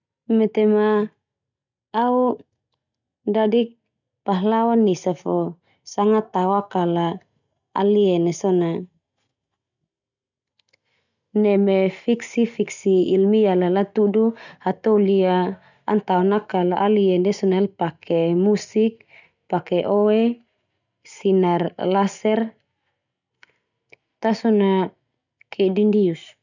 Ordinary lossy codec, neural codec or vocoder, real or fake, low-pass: none; none; real; 7.2 kHz